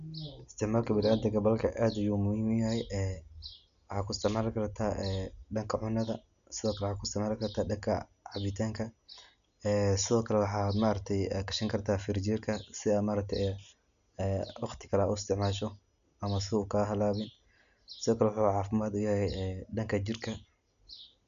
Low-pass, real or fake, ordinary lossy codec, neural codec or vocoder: 7.2 kHz; real; none; none